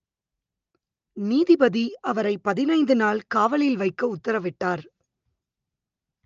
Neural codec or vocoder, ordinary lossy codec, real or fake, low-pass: none; Opus, 24 kbps; real; 7.2 kHz